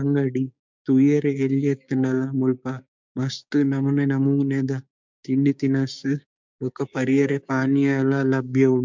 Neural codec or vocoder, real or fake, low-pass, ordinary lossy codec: codec, 16 kHz, 6 kbps, DAC; fake; 7.2 kHz; MP3, 64 kbps